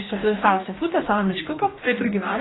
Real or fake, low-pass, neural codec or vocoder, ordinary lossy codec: fake; 7.2 kHz; codec, 44.1 kHz, 2.6 kbps, DAC; AAC, 16 kbps